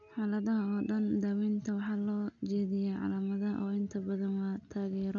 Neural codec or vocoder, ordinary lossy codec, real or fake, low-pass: none; none; real; 7.2 kHz